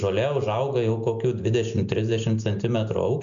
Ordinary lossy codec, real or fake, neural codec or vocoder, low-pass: MP3, 64 kbps; real; none; 7.2 kHz